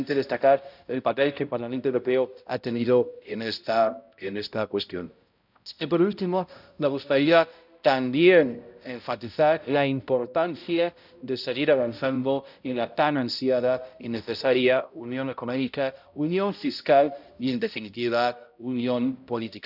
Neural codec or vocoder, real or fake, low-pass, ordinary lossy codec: codec, 16 kHz, 0.5 kbps, X-Codec, HuBERT features, trained on balanced general audio; fake; 5.4 kHz; none